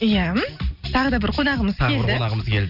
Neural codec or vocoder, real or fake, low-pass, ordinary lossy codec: none; real; 5.4 kHz; none